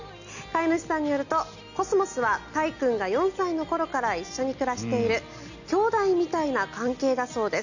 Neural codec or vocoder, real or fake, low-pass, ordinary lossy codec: none; real; 7.2 kHz; none